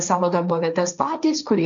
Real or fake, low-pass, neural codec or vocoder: fake; 7.2 kHz; codec, 16 kHz, 1.1 kbps, Voila-Tokenizer